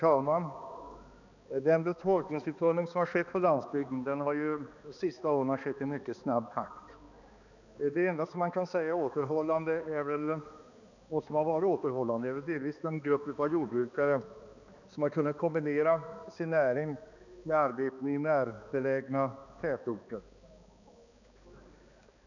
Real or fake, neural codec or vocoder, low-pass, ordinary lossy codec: fake; codec, 16 kHz, 2 kbps, X-Codec, HuBERT features, trained on balanced general audio; 7.2 kHz; none